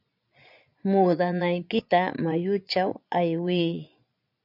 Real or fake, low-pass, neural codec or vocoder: fake; 5.4 kHz; vocoder, 22.05 kHz, 80 mel bands, Vocos